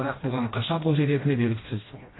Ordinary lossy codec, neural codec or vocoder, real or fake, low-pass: AAC, 16 kbps; codec, 16 kHz, 1 kbps, FreqCodec, smaller model; fake; 7.2 kHz